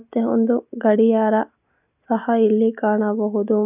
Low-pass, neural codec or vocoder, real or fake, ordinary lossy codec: 3.6 kHz; none; real; none